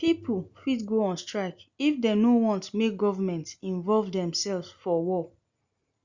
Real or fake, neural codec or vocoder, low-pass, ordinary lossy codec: real; none; 7.2 kHz; Opus, 64 kbps